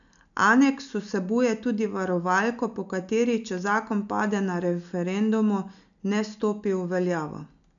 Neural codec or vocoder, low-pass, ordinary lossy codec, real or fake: none; 7.2 kHz; none; real